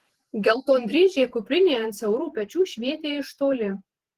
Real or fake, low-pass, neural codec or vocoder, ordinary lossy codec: fake; 14.4 kHz; vocoder, 48 kHz, 128 mel bands, Vocos; Opus, 16 kbps